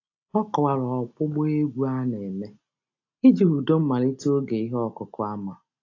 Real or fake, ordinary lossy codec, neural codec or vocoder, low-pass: real; AAC, 48 kbps; none; 7.2 kHz